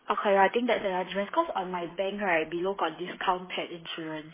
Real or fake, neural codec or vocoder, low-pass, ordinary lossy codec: fake; codec, 16 kHz, 8 kbps, FreqCodec, smaller model; 3.6 kHz; MP3, 16 kbps